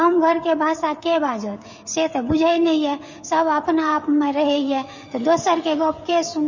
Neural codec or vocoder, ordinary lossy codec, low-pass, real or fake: codec, 16 kHz, 16 kbps, FreqCodec, smaller model; MP3, 32 kbps; 7.2 kHz; fake